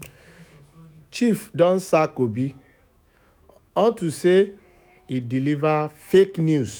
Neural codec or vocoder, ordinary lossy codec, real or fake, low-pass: autoencoder, 48 kHz, 128 numbers a frame, DAC-VAE, trained on Japanese speech; none; fake; none